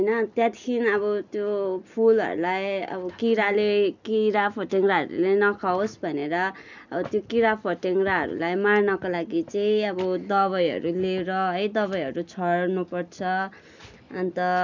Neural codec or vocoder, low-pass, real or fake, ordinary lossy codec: none; 7.2 kHz; real; none